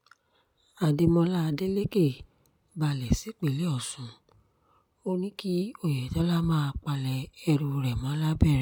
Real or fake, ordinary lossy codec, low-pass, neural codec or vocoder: real; none; none; none